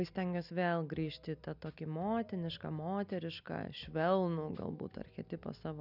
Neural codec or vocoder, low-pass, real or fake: none; 5.4 kHz; real